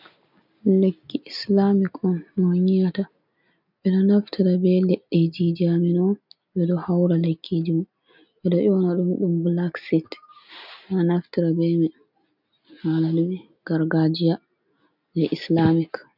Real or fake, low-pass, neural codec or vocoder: real; 5.4 kHz; none